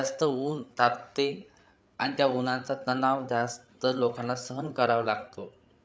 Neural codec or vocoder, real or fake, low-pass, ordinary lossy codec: codec, 16 kHz, 8 kbps, FreqCodec, larger model; fake; none; none